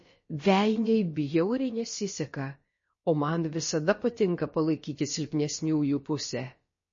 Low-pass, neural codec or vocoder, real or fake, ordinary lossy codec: 7.2 kHz; codec, 16 kHz, about 1 kbps, DyCAST, with the encoder's durations; fake; MP3, 32 kbps